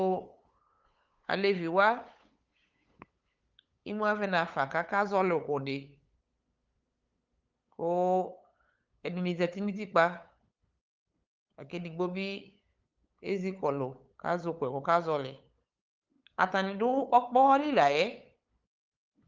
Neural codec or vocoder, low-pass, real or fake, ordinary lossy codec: codec, 16 kHz, 8 kbps, FunCodec, trained on LibriTTS, 25 frames a second; 7.2 kHz; fake; Opus, 24 kbps